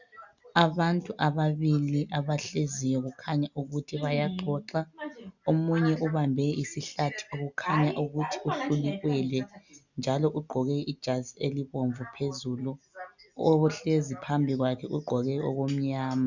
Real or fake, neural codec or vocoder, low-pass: real; none; 7.2 kHz